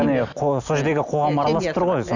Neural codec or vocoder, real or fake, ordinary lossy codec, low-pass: none; real; none; 7.2 kHz